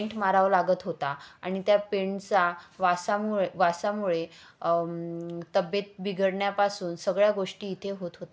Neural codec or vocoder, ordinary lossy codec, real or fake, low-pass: none; none; real; none